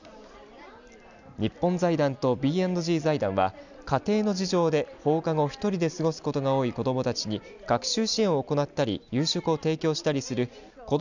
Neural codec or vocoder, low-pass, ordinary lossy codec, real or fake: none; 7.2 kHz; none; real